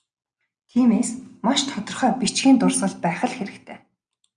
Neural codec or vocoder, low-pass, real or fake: none; 9.9 kHz; real